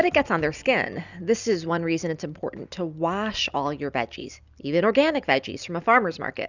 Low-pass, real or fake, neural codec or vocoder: 7.2 kHz; real; none